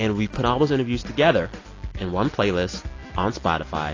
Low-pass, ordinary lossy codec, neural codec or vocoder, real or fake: 7.2 kHz; AAC, 32 kbps; none; real